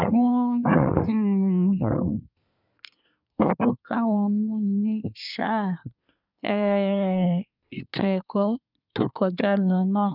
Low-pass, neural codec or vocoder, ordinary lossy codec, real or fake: 5.4 kHz; codec, 24 kHz, 1 kbps, SNAC; none; fake